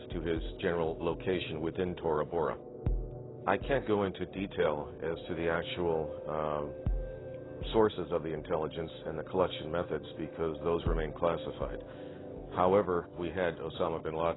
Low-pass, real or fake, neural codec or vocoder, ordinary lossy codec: 7.2 kHz; real; none; AAC, 16 kbps